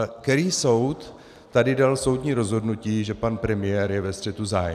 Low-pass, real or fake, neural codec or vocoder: 14.4 kHz; real; none